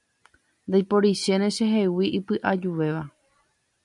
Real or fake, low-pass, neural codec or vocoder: real; 10.8 kHz; none